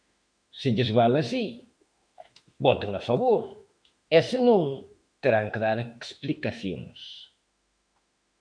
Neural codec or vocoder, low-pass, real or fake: autoencoder, 48 kHz, 32 numbers a frame, DAC-VAE, trained on Japanese speech; 9.9 kHz; fake